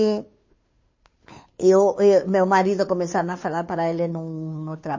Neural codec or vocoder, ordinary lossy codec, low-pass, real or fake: codec, 16 kHz, 2 kbps, FunCodec, trained on Chinese and English, 25 frames a second; MP3, 32 kbps; 7.2 kHz; fake